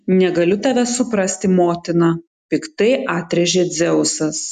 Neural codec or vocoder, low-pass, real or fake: none; 14.4 kHz; real